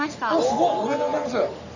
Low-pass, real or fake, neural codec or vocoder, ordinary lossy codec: 7.2 kHz; fake; codec, 44.1 kHz, 3.4 kbps, Pupu-Codec; none